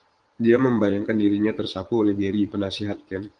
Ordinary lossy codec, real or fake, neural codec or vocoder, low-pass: Opus, 24 kbps; fake; vocoder, 22.05 kHz, 80 mel bands, Vocos; 9.9 kHz